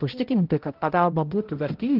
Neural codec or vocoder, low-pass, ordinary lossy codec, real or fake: codec, 16 kHz, 0.5 kbps, X-Codec, HuBERT features, trained on balanced general audio; 5.4 kHz; Opus, 16 kbps; fake